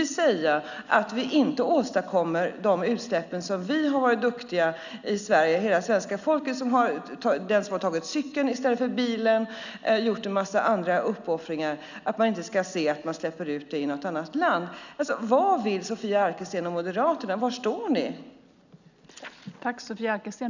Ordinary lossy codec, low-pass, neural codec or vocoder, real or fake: none; 7.2 kHz; none; real